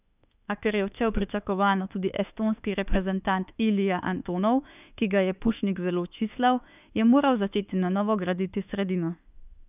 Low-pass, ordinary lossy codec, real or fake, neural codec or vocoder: 3.6 kHz; none; fake; autoencoder, 48 kHz, 32 numbers a frame, DAC-VAE, trained on Japanese speech